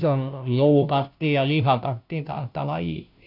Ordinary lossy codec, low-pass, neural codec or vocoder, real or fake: none; 5.4 kHz; codec, 16 kHz, 0.5 kbps, FunCodec, trained on Chinese and English, 25 frames a second; fake